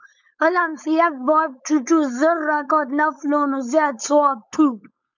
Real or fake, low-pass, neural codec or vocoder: fake; 7.2 kHz; codec, 16 kHz, 4.8 kbps, FACodec